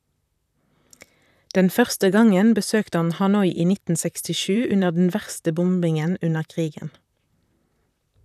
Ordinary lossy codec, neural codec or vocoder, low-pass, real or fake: none; vocoder, 44.1 kHz, 128 mel bands, Pupu-Vocoder; 14.4 kHz; fake